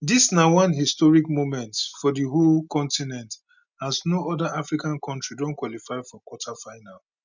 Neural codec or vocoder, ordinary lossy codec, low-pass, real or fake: none; none; 7.2 kHz; real